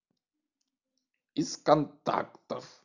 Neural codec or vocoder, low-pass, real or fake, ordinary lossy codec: none; 7.2 kHz; real; none